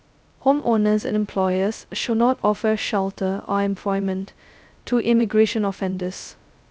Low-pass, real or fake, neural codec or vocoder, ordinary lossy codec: none; fake; codec, 16 kHz, 0.2 kbps, FocalCodec; none